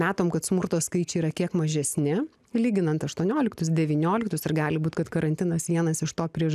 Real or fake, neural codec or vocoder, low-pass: real; none; 14.4 kHz